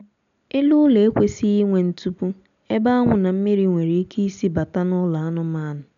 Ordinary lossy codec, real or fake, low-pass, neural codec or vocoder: none; real; 7.2 kHz; none